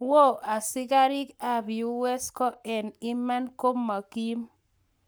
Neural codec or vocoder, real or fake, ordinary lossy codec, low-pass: codec, 44.1 kHz, 7.8 kbps, Pupu-Codec; fake; none; none